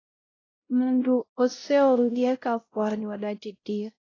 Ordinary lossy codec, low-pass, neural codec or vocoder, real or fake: AAC, 32 kbps; 7.2 kHz; codec, 16 kHz, 0.5 kbps, X-Codec, WavLM features, trained on Multilingual LibriSpeech; fake